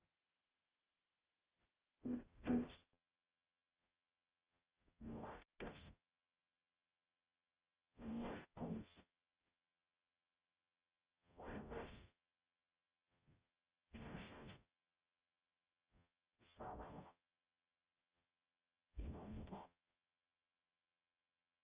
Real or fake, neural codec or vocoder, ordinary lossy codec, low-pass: fake; codec, 44.1 kHz, 0.9 kbps, DAC; Opus, 32 kbps; 3.6 kHz